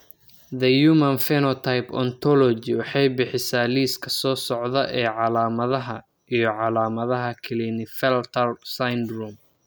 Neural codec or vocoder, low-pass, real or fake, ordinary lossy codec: none; none; real; none